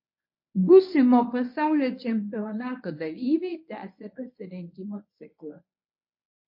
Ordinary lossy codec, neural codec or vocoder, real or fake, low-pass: MP3, 32 kbps; codec, 24 kHz, 0.9 kbps, WavTokenizer, medium speech release version 1; fake; 5.4 kHz